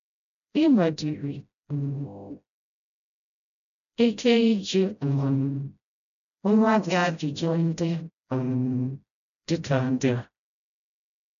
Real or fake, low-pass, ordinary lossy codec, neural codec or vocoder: fake; 7.2 kHz; none; codec, 16 kHz, 0.5 kbps, FreqCodec, smaller model